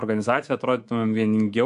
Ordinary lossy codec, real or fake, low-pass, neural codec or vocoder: AAC, 64 kbps; fake; 10.8 kHz; codec, 24 kHz, 3.1 kbps, DualCodec